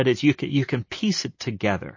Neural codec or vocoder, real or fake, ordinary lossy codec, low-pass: none; real; MP3, 32 kbps; 7.2 kHz